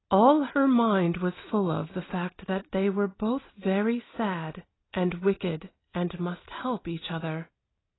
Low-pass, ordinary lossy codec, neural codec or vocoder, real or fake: 7.2 kHz; AAC, 16 kbps; none; real